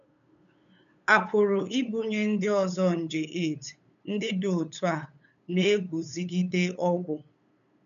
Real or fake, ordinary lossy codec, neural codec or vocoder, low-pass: fake; none; codec, 16 kHz, 8 kbps, FunCodec, trained on LibriTTS, 25 frames a second; 7.2 kHz